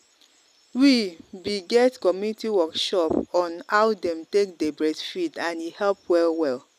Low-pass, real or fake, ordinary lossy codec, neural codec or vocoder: 14.4 kHz; real; none; none